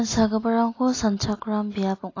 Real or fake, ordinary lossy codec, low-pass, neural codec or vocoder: real; AAC, 32 kbps; 7.2 kHz; none